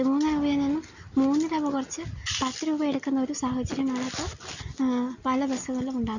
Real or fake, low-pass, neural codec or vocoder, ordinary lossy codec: real; 7.2 kHz; none; none